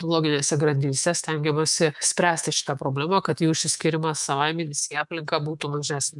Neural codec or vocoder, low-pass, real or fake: codec, 24 kHz, 3.1 kbps, DualCodec; 10.8 kHz; fake